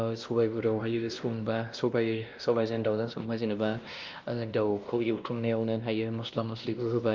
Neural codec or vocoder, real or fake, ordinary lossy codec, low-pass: codec, 16 kHz, 1 kbps, X-Codec, WavLM features, trained on Multilingual LibriSpeech; fake; Opus, 24 kbps; 7.2 kHz